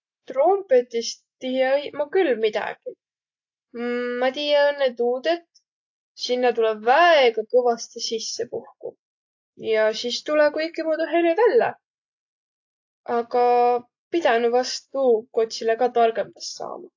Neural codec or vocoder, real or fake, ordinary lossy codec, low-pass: none; real; AAC, 48 kbps; 7.2 kHz